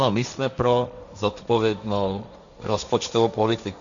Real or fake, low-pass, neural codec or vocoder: fake; 7.2 kHz; codec, 16 kHz, 1.1 kbps, Voila-Tokenizer